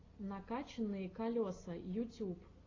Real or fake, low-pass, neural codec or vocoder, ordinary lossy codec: real; 7.2 kHz; none; AAC, 32 kbps